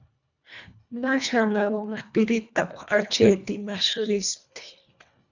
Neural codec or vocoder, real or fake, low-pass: codec, 24 kHz, 1.5 kbps, HILCodec; fake; 7.2 kHz